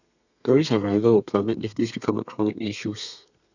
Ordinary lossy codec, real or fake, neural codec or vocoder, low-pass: none; fake; codec, 32 kHz, 1.9 kbps, SNAC; 7.2 kHz